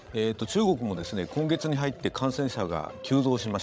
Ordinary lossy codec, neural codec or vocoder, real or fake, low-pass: none; codec, 16 kHz, 16 kbps, FreqCodec, larger model; fake; none